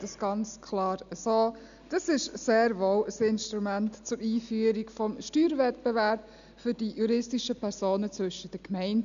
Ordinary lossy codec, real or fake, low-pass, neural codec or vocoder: none; real; 7.2 kHz; none